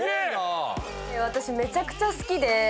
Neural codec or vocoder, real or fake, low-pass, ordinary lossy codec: none; real; none; none